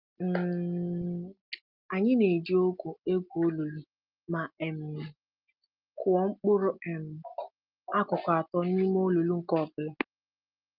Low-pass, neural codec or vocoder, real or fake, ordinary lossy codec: 5.4 kHz; none; real; Opus, 32 kbps